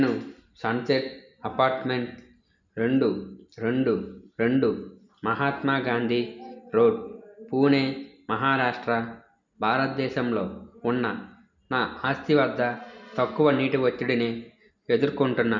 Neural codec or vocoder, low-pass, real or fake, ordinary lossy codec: none; 7.2 kHz; real; none